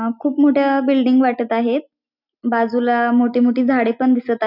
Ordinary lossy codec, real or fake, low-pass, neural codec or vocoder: none; real; 5.4 kHz; none